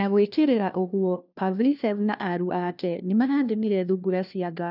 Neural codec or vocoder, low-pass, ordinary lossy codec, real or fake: codec, 16 kHz, 1 kbps, FunCodec, trained on LibriTTS, 50 frames a second; 5.4 kHz; none; fake